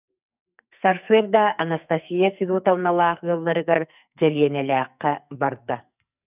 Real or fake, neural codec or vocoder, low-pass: fake; codec, 44.1 kHz, 2.6 kbps, SNAC; 3.6 kHz